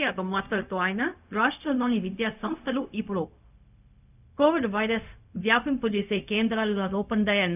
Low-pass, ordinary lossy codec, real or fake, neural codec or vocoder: 3.6 kHz; none; fake; codec, 16 kHz, 0.4 kbps, LongCat-Audio-Codec